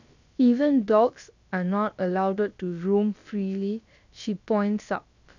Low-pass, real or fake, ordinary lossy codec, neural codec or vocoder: 7.2 kHz; fake; none; codec, 16 kHz, about 1 kbps, DyCAST, with the encoder's durations